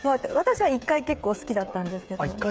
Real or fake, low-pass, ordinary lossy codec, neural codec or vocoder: fake; none; none; codec, 16 kHz, 8 kbps, FreqCodec, smaller model